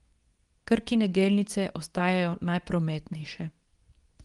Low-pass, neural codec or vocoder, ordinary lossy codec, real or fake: 10.8 kHz; codec, 24 kHz, 0.9 kbps, WavTokenizer, medium speech release version 2; Opus, 24 kbps; fake